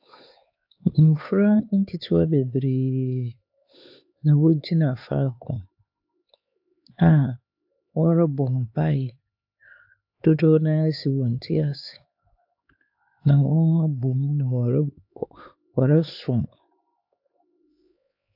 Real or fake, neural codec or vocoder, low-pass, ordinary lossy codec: fake; codec, 16 kHz, 4 kbps, X-Codec, HuBERT features, trained on LibriSpeech; 5.4 kHz; AAC, 48 kbps